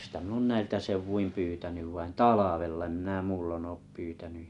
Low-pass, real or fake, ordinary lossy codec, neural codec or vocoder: 10.8 kHz; real; none; none